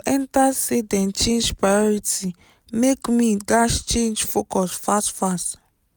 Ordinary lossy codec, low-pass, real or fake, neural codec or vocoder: none; none; real; none